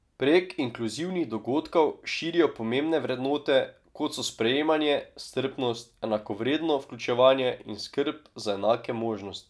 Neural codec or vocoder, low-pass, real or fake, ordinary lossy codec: none; none; real; none